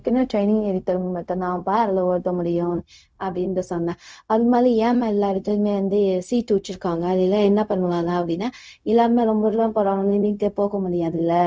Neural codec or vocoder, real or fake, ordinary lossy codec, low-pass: codec, 16 kHz, 0.4 kbps, LongCat-Audio-Codec; fake; none; none